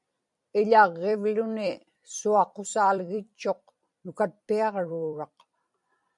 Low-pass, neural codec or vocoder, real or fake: 10.8 kHz; vocoder, 44.1 kHz, 128 mel bands every 256 samples, BigVGAN v2; fake